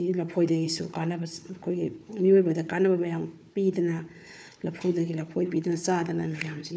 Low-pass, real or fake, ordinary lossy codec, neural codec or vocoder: none; fake; none; codec, 16 kHz, 4 kbps, FunCodec, trained on Chinese and English, 50 frames a second